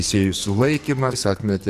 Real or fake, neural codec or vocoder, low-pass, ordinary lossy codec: fake; codec, 44.1 kHz, 2.6 kbps, SNAC; 14.4 kHz; AAC, 96 kbps